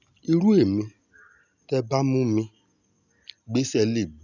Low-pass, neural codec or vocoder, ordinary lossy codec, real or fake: 7.2 kHz; none; none; real